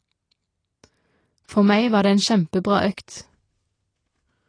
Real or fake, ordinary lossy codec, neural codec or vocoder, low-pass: real; AAC, 32 kbps; none; 9.9 kHz